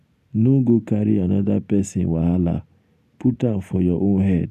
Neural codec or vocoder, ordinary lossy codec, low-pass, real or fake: none; none; 14.4 kHz; real